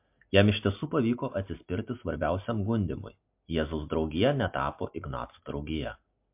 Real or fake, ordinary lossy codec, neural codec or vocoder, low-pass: real; MP3, 32 kbps; none; 3.6 kHz